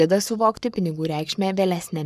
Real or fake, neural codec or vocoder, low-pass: fake; codec, 44.1 kHz, 7.8 kbps, Pupu-Codec; 14.4 kHz